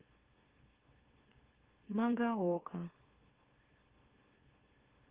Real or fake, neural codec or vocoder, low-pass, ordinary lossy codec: fake; autoencoder, 44.1 kHz, a latent of 192 numbers a frame, MeloTTS; 3.6 kHz; Opus, 16 kbps